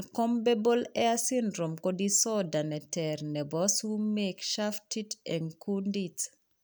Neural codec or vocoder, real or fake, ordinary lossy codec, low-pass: none; real; none; none